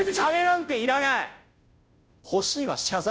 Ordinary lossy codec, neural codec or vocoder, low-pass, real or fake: none; codec, 16 kHz, 0.5 kbps, FunCodec, trained on Chinese and English, 25 frames a second; none; fake